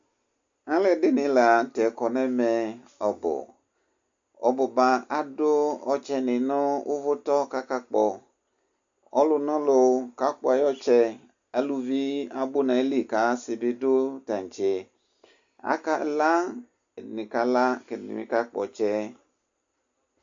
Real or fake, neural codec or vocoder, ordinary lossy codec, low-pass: real; none; AAC, 64 kbps; 7.2 kHz